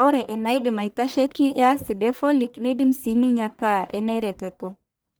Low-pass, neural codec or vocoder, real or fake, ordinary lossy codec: none; codec, 44.1 kHz, 1.7 kbps, Pupu-Codec; fake; none